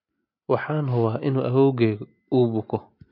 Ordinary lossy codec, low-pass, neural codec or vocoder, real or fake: MP3, 32 kbps; 5.4 kHz; none; real